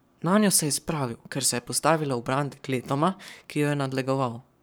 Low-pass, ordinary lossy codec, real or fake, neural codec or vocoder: none; none; fake; codec, 44.1 kHz, 7.8 kbps, Pupu-Codec